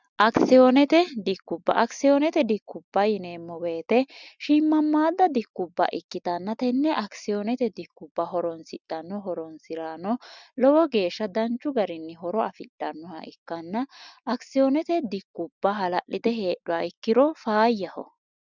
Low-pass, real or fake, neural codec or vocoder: 7.2 kHz; real; none